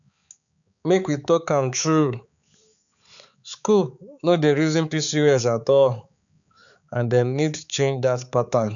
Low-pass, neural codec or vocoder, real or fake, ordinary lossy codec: 7.2 kHz; codec, 16 kHz, 4 kbps, X-Codec, HuBERT features, trained on balanced general audio; fake; none